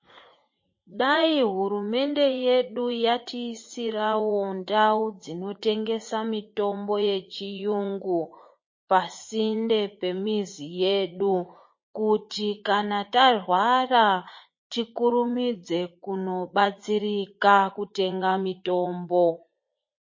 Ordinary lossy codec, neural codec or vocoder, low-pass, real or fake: MP3, 32 kbps; vocoder, 44.1 kHz, 80 mel bands, Vocos; 7.2 kHz; fake